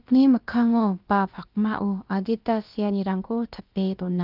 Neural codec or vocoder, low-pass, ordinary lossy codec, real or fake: codec, 16 kHz, about 1 kbps, DyCAST, with the encoder's durations; 5.4 kHz; Opus, 32 kbps; fake